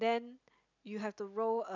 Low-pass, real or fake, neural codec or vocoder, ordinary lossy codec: 7.2 kHz; real; none; none